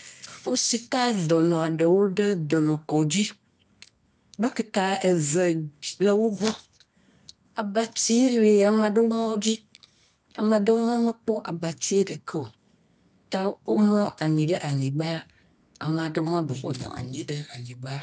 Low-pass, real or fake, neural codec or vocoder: 10.8 kHz; fake; codec, 24 kHz, 0.9 kbps, WavTokenizer, medium music audio release